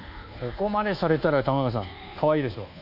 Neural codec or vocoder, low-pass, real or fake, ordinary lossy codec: codec, 24 kHz, 1.2 kbps, DualCodec; 5.4 kHz; fake; none